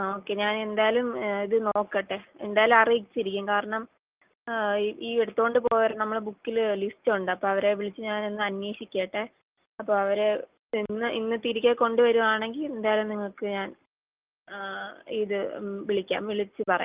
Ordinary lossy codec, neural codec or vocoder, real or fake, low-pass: Opus, 24 kbps; none; real; 3.6 kHz